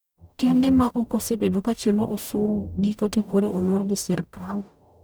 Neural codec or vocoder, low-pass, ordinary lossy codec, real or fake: codec, 44.1 kHz, 0.9 kbps, DAC; none; none; fake